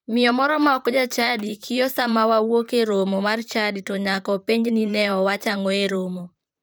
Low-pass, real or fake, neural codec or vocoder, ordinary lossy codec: none; fake; vocoder, 44.1 kHz, 128 mel bands, Pupu-Vocoder; none